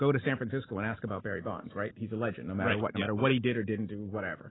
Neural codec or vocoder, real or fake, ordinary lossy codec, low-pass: none; real; AAC, 16 kbps; 7.2 kHz